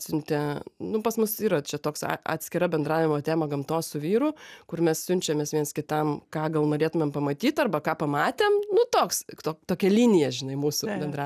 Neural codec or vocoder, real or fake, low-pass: none; real; 14.4 kHz